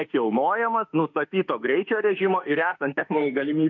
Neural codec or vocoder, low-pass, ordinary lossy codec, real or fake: autoencoder, 48 kHz, 32 numbers a frame, DAC-VAE, trained on Japanese speech; 7.2 kHz; MP3, 64 kbps; fake